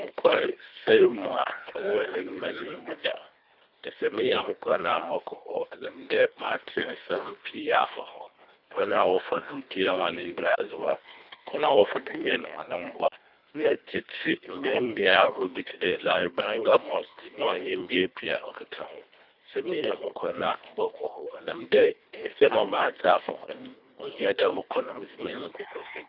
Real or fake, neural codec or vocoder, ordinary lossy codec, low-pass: fake; codec, 24 kHz, 1.5 kbps, HILCodec; none; 5.4 kHz